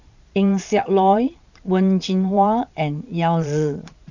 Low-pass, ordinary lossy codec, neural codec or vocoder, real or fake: 7.2 kHz; none; vocoder, 22.05 kHz, 80 mel bands, Vocos; fake